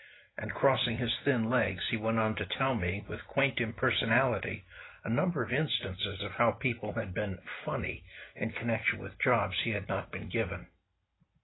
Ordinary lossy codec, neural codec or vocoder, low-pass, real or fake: AAC, 16 kbps; none; 7.2 kHz; real